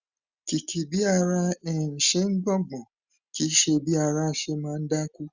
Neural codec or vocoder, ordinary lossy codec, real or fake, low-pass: none; Opus, 64 kbps; real; 7.2 kHz